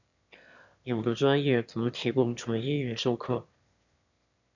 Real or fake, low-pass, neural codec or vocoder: fake; 7.2 kHz; autoencoder, 22.05 kHz, a latent of 192 numbers a frame, VITS, trained on one speaker